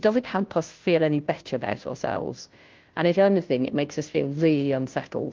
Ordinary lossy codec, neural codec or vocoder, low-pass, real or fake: Opus, 32 kbps; codec, 16 kHz, 0.5 kbps, FunCodec, trained on Chinese and English, 25 frames a second; 7.2 kHz; fake